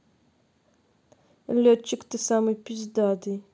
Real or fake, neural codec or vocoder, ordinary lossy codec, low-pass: real; none; none; none